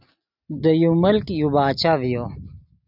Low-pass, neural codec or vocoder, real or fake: 5.4 kHz; none; real